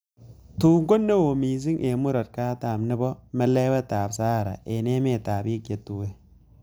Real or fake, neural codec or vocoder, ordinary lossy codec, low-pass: real; none; none; none